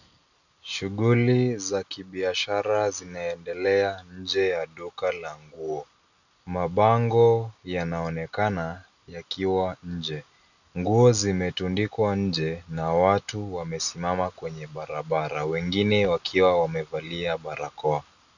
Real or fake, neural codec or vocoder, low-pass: real; none; 7.2 kHz